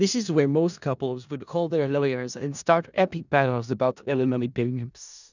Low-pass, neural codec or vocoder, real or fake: 7.2 kHz; codec, 16 kHz in and 24 kHz out, 0.4 kbps, LongCat-Audio-Codec, four codebook decoder; fake